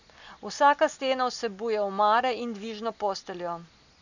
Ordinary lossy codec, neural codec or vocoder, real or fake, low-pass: none; none; real; 7.2 kHz